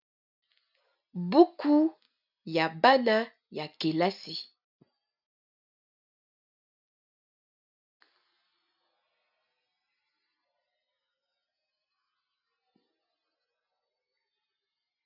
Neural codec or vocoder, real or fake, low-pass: none; real; 5.4 kHz